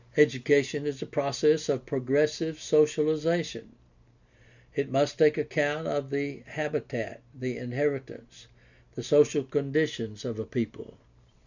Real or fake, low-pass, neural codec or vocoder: real; 7.2 kHz; none